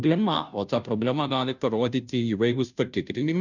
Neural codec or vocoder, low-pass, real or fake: codec, 16 kHz, 0.5 kbps, FunCodec, trained on Chinese and English, 25 frames a second; 7.2 kHz; fake